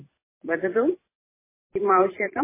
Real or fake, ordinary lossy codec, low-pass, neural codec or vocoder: fake; MP3, 16 kbps; 3.6 kHz; vocoder, 44.1 kHz, 128 mel bands every 256 samples, BigVGAN v2